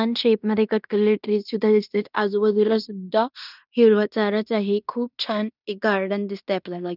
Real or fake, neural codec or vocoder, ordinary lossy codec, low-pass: fake; codec, 16 kHz in and 24 kHz out, 0.9 kbps, LongCat-Audio-Codec, fine tuned four codebook decoder; none; 5.4 kHz